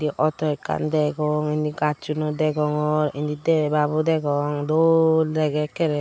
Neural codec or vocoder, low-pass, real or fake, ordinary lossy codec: none; none; real; none